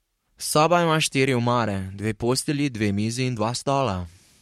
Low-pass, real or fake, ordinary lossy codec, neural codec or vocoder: 19.8 kHz; fake; MP3, 64 kbps; codec, 44.1 kHz, 7.8 kbps, Pupu-Codec